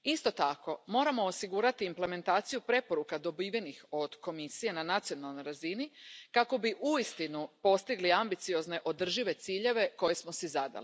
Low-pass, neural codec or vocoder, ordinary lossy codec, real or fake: none; none; none; real